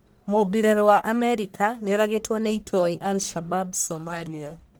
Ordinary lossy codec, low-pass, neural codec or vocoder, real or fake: none; none; codec, 44.1 kHz, 1.7 kbps, Pupu-Codec; fake